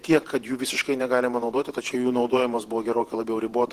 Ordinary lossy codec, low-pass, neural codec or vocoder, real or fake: Opus, 16 kbps; 14.4 kHz; vocoder, 48 kHz, 128 mel bands, Vocos; fake